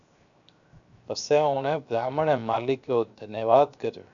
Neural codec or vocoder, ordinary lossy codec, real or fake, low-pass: codec, 16 kHz, 0.7 kbps, FocalCodec; MP3, 96 kbps; fake; 7.2 kHz